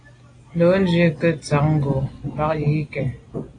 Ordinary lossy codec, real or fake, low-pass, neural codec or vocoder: AAC, 32 kbps; real; 9.9 kHz; none